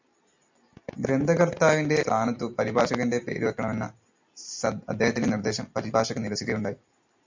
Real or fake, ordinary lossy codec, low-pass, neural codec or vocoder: real; MP3, 64 kbps; 7.2 kHz; none